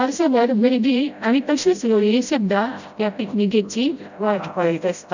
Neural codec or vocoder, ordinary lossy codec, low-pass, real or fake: codec, 16 kHz, 0.5 kbps, FreqCodec, smaller model; none; 7.2 kHz; fake